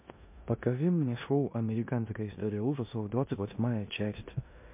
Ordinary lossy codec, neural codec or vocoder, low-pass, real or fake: MP3, 32 kbps; codec, 16 kHz in and 24 kHz out, 0.9 kbps, LongCat-Audio-Codec, four codebook decoder; 3.6 kHz; fake